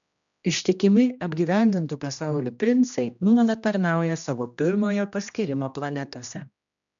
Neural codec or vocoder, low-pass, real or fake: codec, 16 kHz, 1 kbps, X-Codec, HuBERT features, trained on general audio; 7.2 kHz; fake